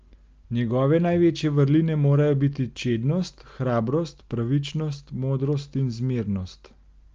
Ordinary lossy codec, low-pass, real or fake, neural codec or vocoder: Opus, 24 kbps; 7.2 kHz; real; none